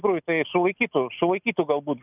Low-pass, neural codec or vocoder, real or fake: 3.6 kHz; none; real